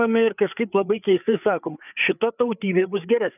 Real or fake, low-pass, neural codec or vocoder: fake; 3.6 kHz; codec, 16 kHz, 4 kbps, FreqCodec, larger model